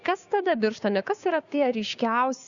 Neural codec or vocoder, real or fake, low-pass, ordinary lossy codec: codec, 16 kHz, 6 kbps, DAC; fake; 7.2 kHz; Opus, 64 kbps